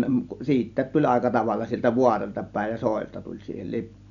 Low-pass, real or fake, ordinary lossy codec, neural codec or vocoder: 7.2 kHz; real; none; none